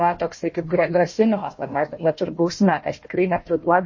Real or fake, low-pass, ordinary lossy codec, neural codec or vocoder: fake; 7.2 kHz; MP3, 32 kbps; codec, 16 kHz, 1 kbps, FunCodec, trained on Chinese and English, 50 frames a second